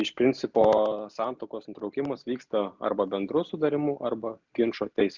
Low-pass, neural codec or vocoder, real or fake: 7.2 kHz; none; real